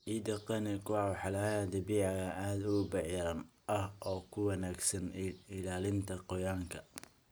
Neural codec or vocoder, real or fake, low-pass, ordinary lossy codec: none; real; none; none